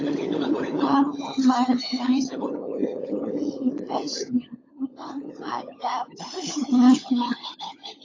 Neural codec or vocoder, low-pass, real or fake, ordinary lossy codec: codec, 16 kHz, 16 kbps, FunCodec, trained on LibriTTS, 50 frames a second; 7.2 kHz; fake; AAC, 32 kbps